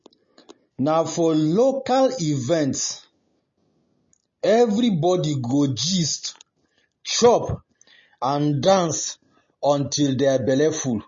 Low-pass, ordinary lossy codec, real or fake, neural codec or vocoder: 7.2 kHz; MP3, 32 kbps; real; none